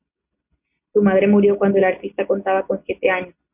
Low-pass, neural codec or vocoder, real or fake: 3.6 kHz; none; real